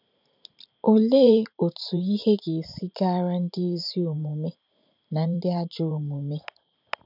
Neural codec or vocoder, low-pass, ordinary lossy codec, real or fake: vocoder, 44.1 kHz, 128 mel bands every 512 samples, BigVGAN v2; 5.4 kHz; none; fake